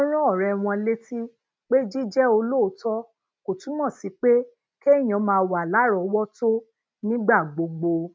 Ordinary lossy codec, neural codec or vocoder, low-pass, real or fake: none; none; none; real